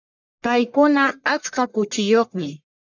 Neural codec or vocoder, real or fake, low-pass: codec, 44.1 kHz, 1.7 kbps, Pupu-Codec; fake; 7.2 kHz